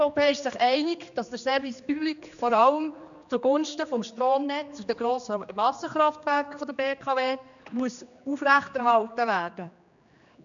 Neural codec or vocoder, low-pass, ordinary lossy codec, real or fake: codec, 16 kHz, 2 kbps, X-Codec, HuBERT features, trained on general audio; 7.2 kHz; none; fake